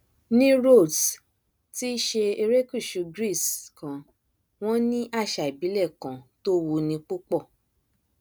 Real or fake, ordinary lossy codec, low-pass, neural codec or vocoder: real; none; none; none